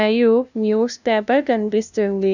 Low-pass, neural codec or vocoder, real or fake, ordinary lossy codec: 7.2 kHz; codec, 16 kHz, 0.5 kbps, FunCodec, trained on LibriTTS, 25 frames a second; fake; none